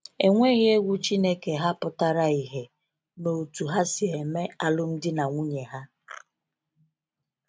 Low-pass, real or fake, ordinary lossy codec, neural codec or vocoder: none; real; none; none